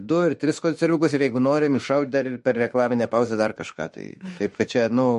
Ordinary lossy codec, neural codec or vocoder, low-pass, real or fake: MP3, 48 kbps; autoencoder, 48 kHz, 32 numbers a frame, DAC-VAE, trained on Japanese speech; 14.4 kHz; fake